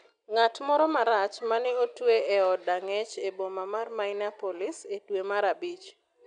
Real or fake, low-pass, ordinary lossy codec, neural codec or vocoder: real; 9.9 kHz; none; none